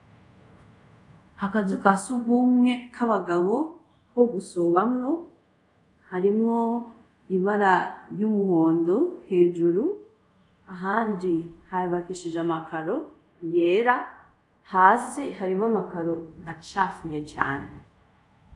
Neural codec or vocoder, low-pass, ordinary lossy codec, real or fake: codec, 24 kHz, 0.5 kbps, DualCodec; 10.8 kHz; AAC, 64 kbps; fake